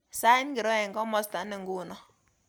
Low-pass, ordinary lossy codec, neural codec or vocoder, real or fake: none; none; none; real